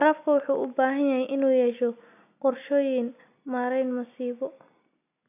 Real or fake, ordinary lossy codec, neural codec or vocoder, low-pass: real; none; none; 3.6 kHz